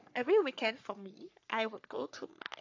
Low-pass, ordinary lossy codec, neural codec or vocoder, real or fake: 7.2 kHz; AAC, 48 kbps; codec, 44.1 kHz, 3.4 kbps, Pupu-Codec; fake